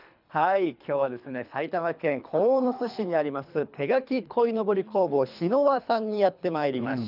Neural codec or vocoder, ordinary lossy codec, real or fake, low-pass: codec, 24 kHz, 3 kbps, HILCodec; none; fake; 5.4 kHz